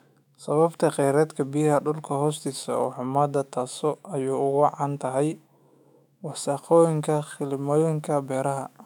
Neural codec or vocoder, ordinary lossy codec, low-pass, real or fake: autoencoder, 48 kHz, 128 numbers a frame, DAC-VAE, trained on Japanese speech; none; 19.8 kHz; fake